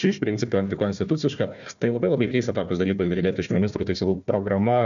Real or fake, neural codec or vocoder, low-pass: fake; codec, 16 kHz, 1 kbps, FunCodec, trained on Chinese and English, 50 frames a second; 7.2 kHz